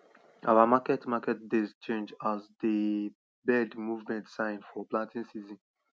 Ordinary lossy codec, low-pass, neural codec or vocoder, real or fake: none; none; none; real